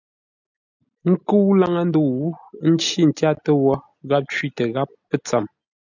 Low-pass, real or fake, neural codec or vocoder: 7.2 kHz; real; none